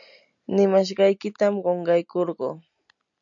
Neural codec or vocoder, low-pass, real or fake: none; 7.2 kHz; real